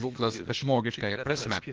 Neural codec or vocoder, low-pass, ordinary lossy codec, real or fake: codec, 16 kHz, 0.8 kbps, ZipCodec; 7.2 kHz; Opus, 16 kbps; fake